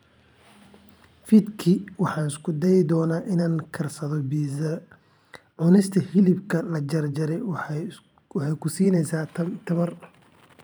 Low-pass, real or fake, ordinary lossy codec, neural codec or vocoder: none; fake; none; vocoder, 44.1 kHz, 128 mel bands every 512 samples, BigVGAN v2